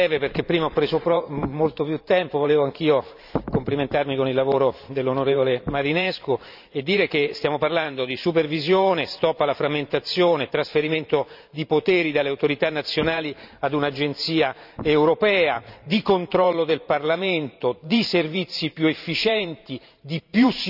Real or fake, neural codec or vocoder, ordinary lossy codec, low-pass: fake; vocoder, 44.1 kHz, 128 mel bands every 512 samples, BigVGAN v2; none; 5.4 kHz